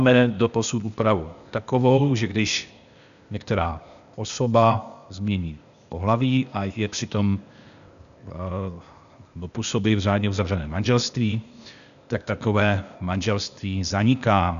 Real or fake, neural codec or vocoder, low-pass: fake; codec, 16 kHz, 0.8 kbps, ZipCodec; 7.2 kHz